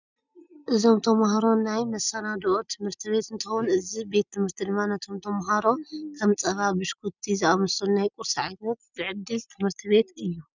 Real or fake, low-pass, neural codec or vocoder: real; 7.2 kHz; none